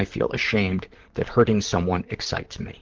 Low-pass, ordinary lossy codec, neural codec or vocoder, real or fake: 7.2 kHz; Opus, 16 kbps; vocoder, 44.1 kHz, 128 mel bands, Pupu-Vocoder; fake